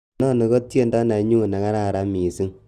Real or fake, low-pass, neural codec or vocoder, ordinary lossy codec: real; 14.4 kHz; none; Opus, 24 kbps